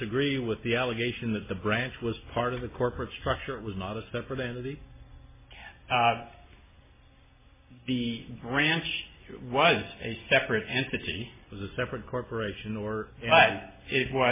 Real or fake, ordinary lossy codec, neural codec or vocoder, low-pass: real; MP3, 16 kbps; none; 3.6 kHz